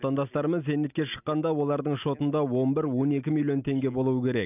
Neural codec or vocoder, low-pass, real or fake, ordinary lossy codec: none; 3.6 kHz; real; none